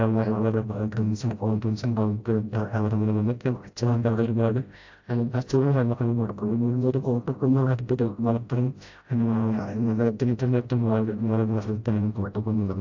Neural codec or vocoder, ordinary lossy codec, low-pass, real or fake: codec, 16 kHz, 0.5 kbps, FreqCodec, smaller model; AAC, 48 kbps; 7.2 kHz; fake